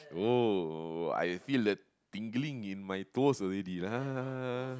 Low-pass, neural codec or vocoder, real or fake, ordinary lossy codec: none; none; real; none